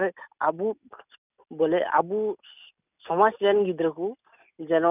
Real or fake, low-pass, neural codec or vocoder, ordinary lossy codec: real; 3.6 kHz; none; none